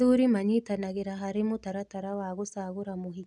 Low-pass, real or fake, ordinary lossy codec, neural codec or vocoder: 10.8 kHz; real; none; none